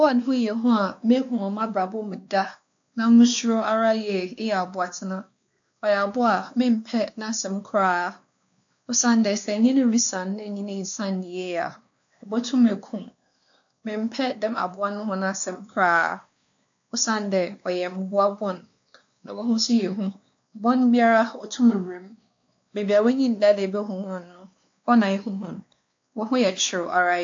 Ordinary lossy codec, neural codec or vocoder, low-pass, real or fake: AAC, 64 kbps; codec, 16 kHz, 2 kbps, X-Codec, WavLM features, trained on Multilingual LibriSpeech; 7.2 kHz; fake